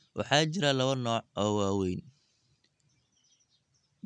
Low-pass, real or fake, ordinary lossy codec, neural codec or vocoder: 9.9 kHz; real; none; none